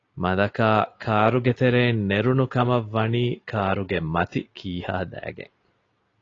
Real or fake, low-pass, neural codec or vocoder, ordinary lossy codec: real; 7.2 kHz; none; AAC, 32 kbps